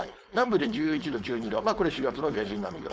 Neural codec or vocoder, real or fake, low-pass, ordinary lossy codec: codec, 16 kHz, 4.8 kbps, FACodec; fake; none; none